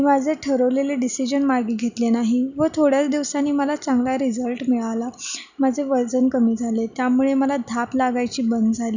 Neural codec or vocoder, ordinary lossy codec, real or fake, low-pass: none; none; real; 7.2 kHz